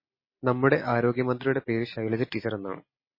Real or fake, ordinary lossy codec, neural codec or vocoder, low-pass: real; MP3, 24 kbps; none; 5.4 kHz